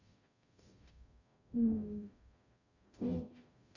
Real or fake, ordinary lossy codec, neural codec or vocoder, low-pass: fake; none; codec, 44.1 kHz, 0.9 kbps, DAC; 7.2 kHz